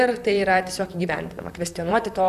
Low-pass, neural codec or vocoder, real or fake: 14.4 kHz; vocoder, 44.1 kHz, 128 mel bands, Pupu-Vocoder; fake